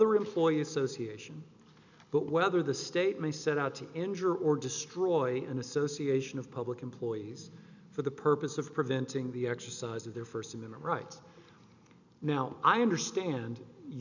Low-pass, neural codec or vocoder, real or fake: 7.2 kHz; none; real